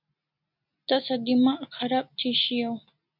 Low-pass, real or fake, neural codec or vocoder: 5.4 kHz; real; none